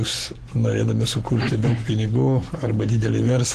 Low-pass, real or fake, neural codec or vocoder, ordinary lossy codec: 14.4 kHz; fake; codec, 44.1 kHz, 7.8 kbps, Pupu-Codec; Opus, 16 kbps